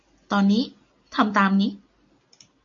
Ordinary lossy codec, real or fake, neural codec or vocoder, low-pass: MP3, 64 kbps; real; none; 7.2 kHz